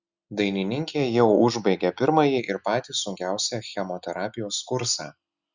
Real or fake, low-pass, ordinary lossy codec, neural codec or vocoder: real; 7.2 kHz; Opus, 64 kbps; none